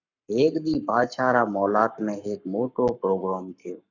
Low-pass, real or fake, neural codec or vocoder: 7.2 kHz; fake; codec, 44.1 kHz, 7.8 kbps, Pupu-Codec